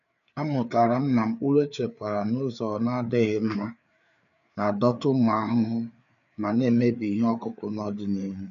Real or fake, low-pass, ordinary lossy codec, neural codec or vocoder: fake; 7.2 kHz; none; codec, 16 kHz, 4 kbps, FreqCodec, larger model